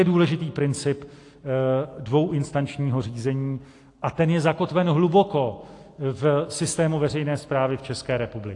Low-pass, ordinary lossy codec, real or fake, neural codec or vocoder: 10.8 kHz; AAC, 48 kbps; real; none